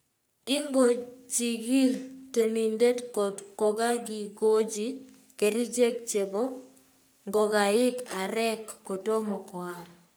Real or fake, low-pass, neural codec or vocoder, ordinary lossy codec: fake; none; codec, 44.1 kHz, 3.4 kbps, Pupu-Codec; none